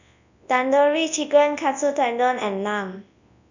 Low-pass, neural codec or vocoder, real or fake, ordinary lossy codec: 7.2 kHz; codec, 24 kHz, 0.9 kbps, WavTokenizer, large speech release; fake; none